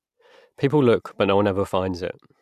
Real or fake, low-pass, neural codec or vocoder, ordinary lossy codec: real; 14.4 kHz; none; none